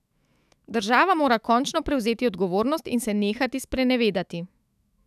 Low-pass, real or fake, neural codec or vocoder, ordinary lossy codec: 14.4 kHz; fake; autoencoder, 48 kHz, 128 numbers a frame, DAC-VAE, trained on Japanese speech; none